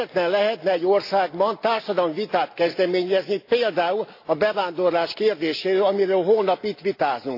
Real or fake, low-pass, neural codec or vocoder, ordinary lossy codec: real; 5.4 kHz; none; AAC, 32 kbps